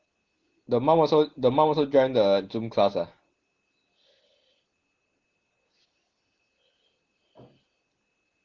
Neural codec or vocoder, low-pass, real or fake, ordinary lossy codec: none; 7.2 kHz; real; Opus, 16 kbps